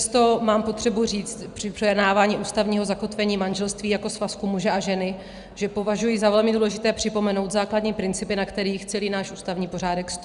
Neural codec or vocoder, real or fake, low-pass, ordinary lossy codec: none; real; 10.8 kHz; Opus, 64 kbps